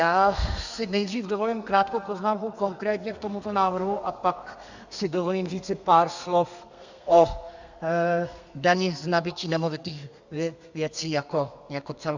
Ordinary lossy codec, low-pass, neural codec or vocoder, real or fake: Opus, 64 kbps; 7.2 kHz; codec, 32 kHz, 1.9 kbps, SNAC; fake